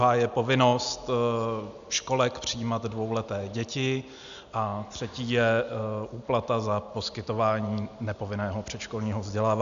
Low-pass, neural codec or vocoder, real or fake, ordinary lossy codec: 7.2 kHz; none; real; AAC, 96 kbps